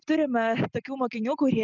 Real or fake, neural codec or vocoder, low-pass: real; none; 7.2 kHz